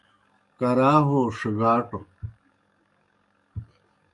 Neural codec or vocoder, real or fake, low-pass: codec, 44.1 kHz, 7.8 kbps, DAC; fake; 10.8 kHz